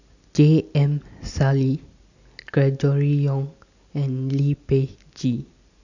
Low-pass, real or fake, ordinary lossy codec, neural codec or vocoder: 7.2 kHz; real; none; none